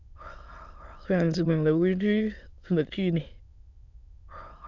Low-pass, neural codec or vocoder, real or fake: 7.2 kHz; autoencoder, 22.05 kHz, a latent of 192 numbers a frame, VITS, trained on many speakers; fake